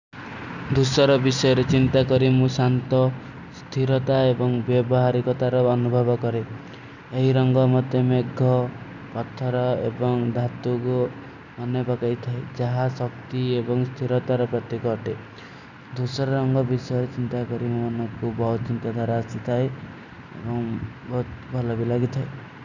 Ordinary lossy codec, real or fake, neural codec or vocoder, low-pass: none; real; none; 7.2 kHz